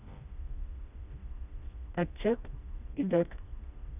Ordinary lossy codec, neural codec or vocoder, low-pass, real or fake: none; codec, 16 kHz, 1 kbps, FreqCodec, smaller model; 3.6 kHz; fake